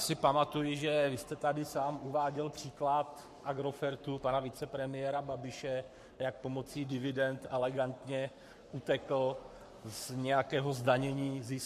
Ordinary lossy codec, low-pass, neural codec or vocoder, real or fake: MP3, 64 kbps; 14.4 kHz; codec, 44.1 kHz, 7.8 kbps, Pupu-Codec; fake